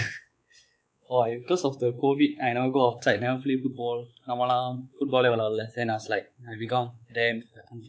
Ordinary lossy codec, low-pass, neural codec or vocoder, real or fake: none; none; codec, 16 kHz, 4 kbps, X-Codec, WavLM features, trained on Multilingual LibriSpeech; fake